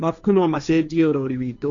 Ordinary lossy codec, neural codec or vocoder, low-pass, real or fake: none; codec, 16 kHz, 1.1 kbps, Voila-Tokenizer; 7.2 kHz; fake